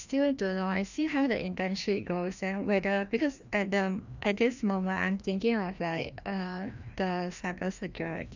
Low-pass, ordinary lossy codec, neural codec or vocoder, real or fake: 7.2 kHz; none; codec, 16 kHz, 1 kbps, FreqCodec, larger model; fake